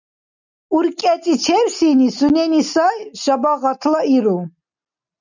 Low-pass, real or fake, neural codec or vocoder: 7.2 kHz; real; none